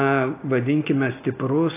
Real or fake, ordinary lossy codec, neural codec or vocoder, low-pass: real; AAC, 24 kbps; none; 3.6 kHz